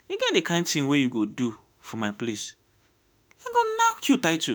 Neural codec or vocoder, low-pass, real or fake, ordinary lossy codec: autoencoder, 48 kHz, 32 numbers a frame, DAC-VAE, trained on Japanese speech; none; fake; none